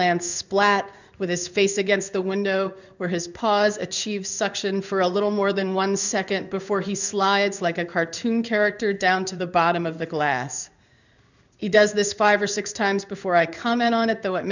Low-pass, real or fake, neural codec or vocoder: 7.2 kHz; fake; codec, 16 kHz in and 24 kHz out, 1 kbps, XY-Tokenizer